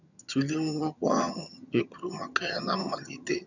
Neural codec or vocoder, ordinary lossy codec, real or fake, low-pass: vocoder, 22.05 kHz, 80 mel bands, HiFi-GAN; none; fake; 7.2 kHz